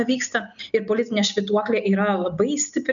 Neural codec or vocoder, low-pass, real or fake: none; 7.2 kHz; real